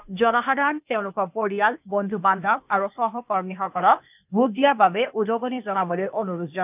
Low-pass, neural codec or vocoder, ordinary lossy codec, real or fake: 3.6 kHz; codec, 16 kHz, 0.8 kbps, ZipCodec; none; fake